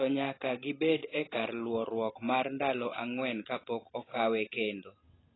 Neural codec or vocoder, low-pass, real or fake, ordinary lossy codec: none; 7.2 kHz; real; AAC, 16 kbps